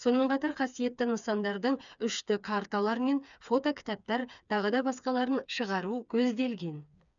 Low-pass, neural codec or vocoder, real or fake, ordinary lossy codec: 7.2 kHz; codec, 16 kHz, 4 kbps, FreqCodec, smaller model; fake; none